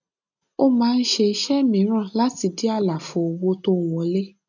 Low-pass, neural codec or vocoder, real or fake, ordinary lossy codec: 7.2 kHz; none; real; none